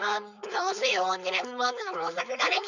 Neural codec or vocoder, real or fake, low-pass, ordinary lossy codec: codec, 16 kHz, 4.8 kbps, FACodec; fake; 7.2 kHz; none